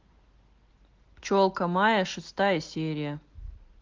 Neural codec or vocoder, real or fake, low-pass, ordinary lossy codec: none; real; 7.2 kHz; Opus, 16 kbps